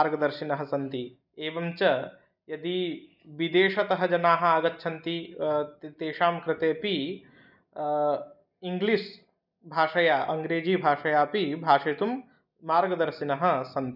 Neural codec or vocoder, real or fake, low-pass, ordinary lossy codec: none; real; 5.4 kHz; none